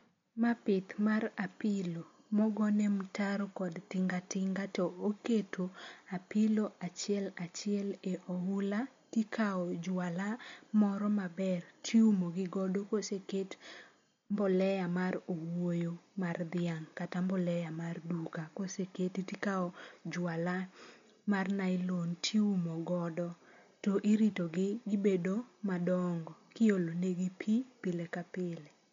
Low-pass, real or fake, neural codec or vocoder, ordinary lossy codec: 7.2 kHz; real; none; MP3, 48 kbps